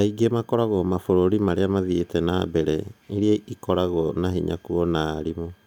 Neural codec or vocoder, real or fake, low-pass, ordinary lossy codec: none; real; none; none